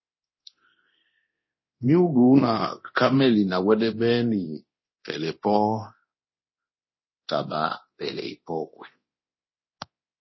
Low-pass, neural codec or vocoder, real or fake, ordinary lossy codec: 7.2 kHz; codec, 24 kHz, 0.9 kbps, DualCodec; fake; MP3, 24 kbps